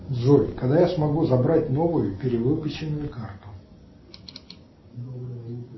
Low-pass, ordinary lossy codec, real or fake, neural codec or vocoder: 7.2 kHz; MP3, 24 kbps; real; none